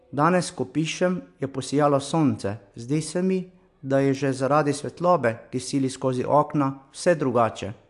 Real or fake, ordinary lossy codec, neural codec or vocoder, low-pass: real; AAC, 64 kbps; none; 10.8 kHz